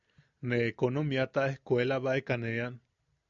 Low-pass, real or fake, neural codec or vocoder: 7.2 kHz; real; none